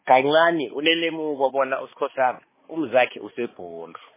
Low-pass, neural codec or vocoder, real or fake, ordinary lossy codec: 3.6 kHz; codec, 16 kHz, 2 kbps, X-Codec, HuBERT features, trained on balanced general audio; fake; MP3, 16 kbps